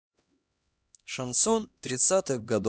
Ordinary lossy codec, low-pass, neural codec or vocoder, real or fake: none; none; codec, 16 kHz, 1 kbps, X-Codec, HuBERT features, trained on LibriSpeech; fake